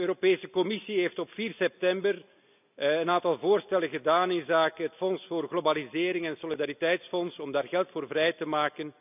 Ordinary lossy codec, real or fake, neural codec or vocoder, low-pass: none; real; none; 3.6 kHz